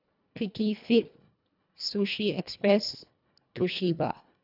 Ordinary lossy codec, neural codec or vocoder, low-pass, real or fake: none; codec, 24 kHz, 1.5 kbps, HILCodec; 5.4 kHz; fake